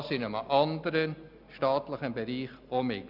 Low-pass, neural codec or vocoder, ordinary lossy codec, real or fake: 5.4 kHz; none; none; real